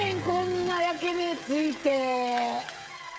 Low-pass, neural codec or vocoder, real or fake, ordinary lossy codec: none; codec, 16 kHz, 16 kbps, FreqCodec, smaller model; fake; none